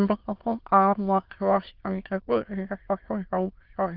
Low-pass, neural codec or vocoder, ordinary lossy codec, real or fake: 5.4 kHz; autoencoder, 22.05 kHz, a latent of 192 numbers a frame, VITS, trained on many speakers; Opus, 16 kbps; fake